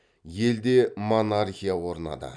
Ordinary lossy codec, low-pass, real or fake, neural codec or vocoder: none; 9.9 kHz; real; none